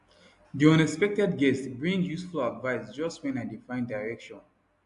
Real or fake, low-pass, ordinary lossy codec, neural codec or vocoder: real; 10.8 kHz; AAC, 64 kbps; none